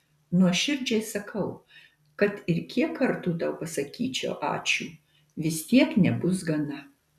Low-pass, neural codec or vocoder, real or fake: 14.4 kHz; none; real